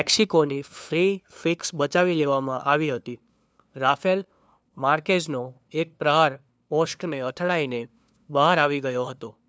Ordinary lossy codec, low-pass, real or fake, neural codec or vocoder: none; none; fake; codec, 16 kHz, 2 kbps, FunCodec, trained on LibriTTS, 25 frames a second